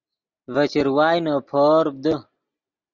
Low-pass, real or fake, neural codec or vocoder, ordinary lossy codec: 7.2 kHz; real; none; Opus, 64 kbps